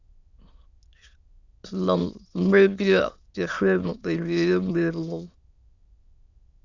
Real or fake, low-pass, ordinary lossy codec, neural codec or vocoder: fake; 7.2 kHz; Opus, 64 kbps; autoencoder, 22.05 kHz, a latent of 192 numbers a frame, VITS, trained on many speakers